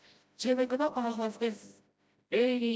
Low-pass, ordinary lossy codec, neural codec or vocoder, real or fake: none; none; codec, 16 kHz, 0.5 kbps, FreqCodec, smaller model; fake